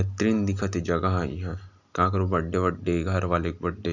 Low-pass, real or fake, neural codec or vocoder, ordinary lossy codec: 7.2 kHz; real; none; none